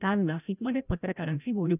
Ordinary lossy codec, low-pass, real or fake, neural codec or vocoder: none; 3.6 kHz; fake; codec, 16 kHz, 0.5 kbps, FreqCodec, larger model